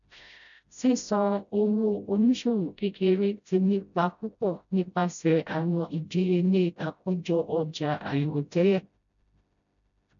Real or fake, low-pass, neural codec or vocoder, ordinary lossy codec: fake; 7.2 kHz; codec, 16 kHz, 0.5 kbps, FreqCodec, smaller model; none